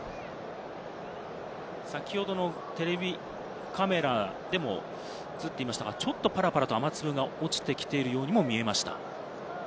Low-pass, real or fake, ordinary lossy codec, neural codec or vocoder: none; real; none; none